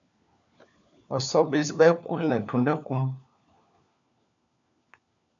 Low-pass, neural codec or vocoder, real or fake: 7.2 kHz; codec, 16 kHz, 4 kbps, FunCodec, trained on LibriTTS, 50 frames a second; fake